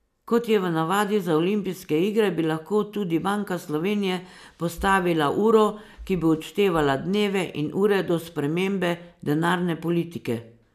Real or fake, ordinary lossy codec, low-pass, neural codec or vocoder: real; none; 14.4 kHz; none